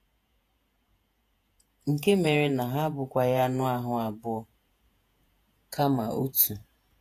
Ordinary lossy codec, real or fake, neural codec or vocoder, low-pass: AAC, 64 kbps; fake; vocoder, 48 kHz, 128 mel bands, Vocos; 14.4 kHz